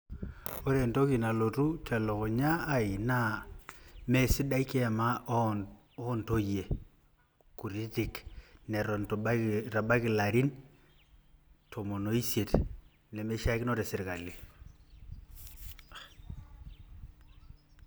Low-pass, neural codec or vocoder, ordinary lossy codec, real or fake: none; none; none; real